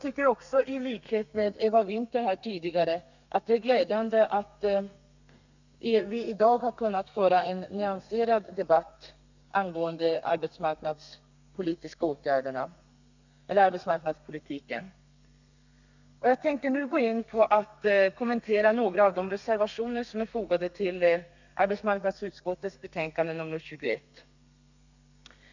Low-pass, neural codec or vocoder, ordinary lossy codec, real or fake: 7.2 kHz; codec, 32 kHz, 1.9 kbps, SNAC; none; fake